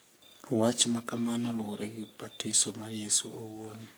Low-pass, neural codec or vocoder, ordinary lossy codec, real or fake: none; codec, 44.1 kHz, 3.4 kbps, Pupu-Codec; none; fake